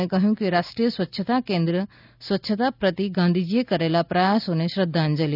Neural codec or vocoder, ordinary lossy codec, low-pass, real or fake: none; none; 5.4 kHz; real